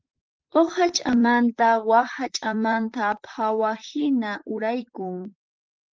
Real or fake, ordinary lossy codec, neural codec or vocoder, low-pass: fake; Opus, 24 kbps; vocoder, 44.1 kHz, 128 mel bands, Pupu-Vocoder; 7.2 kHz